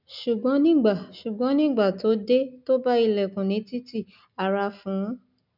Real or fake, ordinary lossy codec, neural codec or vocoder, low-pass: real; none; none; 5.4 kHz